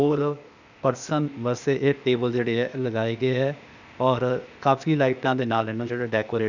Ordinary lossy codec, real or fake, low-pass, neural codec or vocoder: none; fake; 7.2 kHz; codec, 16 kHz, 0.8 kbps, ZipCodec